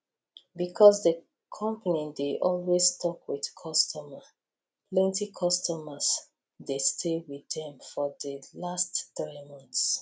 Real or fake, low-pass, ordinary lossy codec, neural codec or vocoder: real; none; none; none